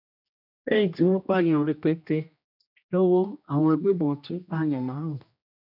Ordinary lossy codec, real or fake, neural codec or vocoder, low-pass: none; fake; codec, 16 kHz, 1 kbps, X-Codec, HuBERT features, trained on general audio; 5.4 kHz